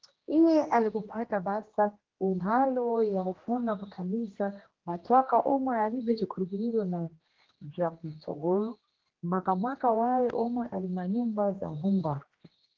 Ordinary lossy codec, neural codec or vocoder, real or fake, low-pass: Opus, 16 kbps; codec, 16 kHz, 1 kbps, X-Codec, HuBERT features, trained on general audio; fake; 7.2 kHz